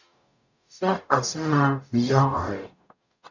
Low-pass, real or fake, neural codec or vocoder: 7.2 kHz; fake; codec, 44.1 kHz, 0.9 kbps, DAC